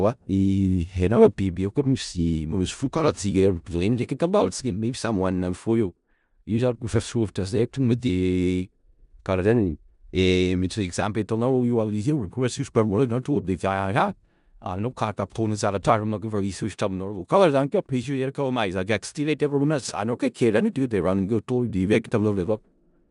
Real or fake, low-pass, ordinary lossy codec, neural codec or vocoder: fake; 10.8 kHz; none; codec, 16 kHz in and 24 kHz out, 0.4 kbps, LongCat-Audio-Codec, four codebook decoder